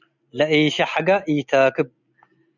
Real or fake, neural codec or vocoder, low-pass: real; none; 7.2 kHz